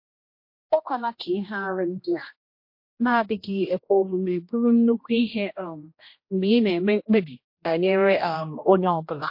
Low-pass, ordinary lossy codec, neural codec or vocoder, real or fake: 5.4 kHz; MP3, 32 kbps; codec, 16 kHz, 1 kbps, X-Codec, HuBERT features, trained on general audio; fake